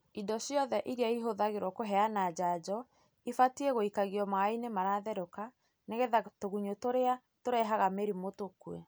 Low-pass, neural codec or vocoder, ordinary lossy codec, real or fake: none; none; none; real